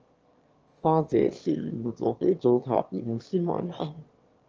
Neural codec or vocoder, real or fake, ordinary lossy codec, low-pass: autoencoder, 22.05 kHz, a latent of 192 numbers a frame, VITS, trained on one speaker; fake; Opus, 24 kbps; 7.2 kHz